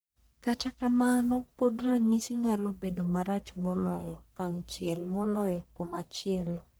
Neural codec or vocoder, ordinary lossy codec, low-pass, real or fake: codec, 44.1 kHz, 1.7 kbps, Pupu-Codec; none; none; fake